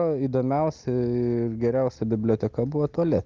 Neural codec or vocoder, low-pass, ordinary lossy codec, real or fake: none; 7.2 kHz; Opus, 24 kbps; real